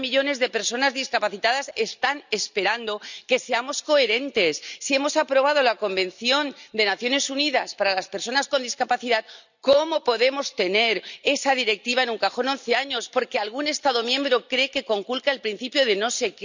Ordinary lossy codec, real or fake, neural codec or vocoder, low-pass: none; real; none; 7.2 kHz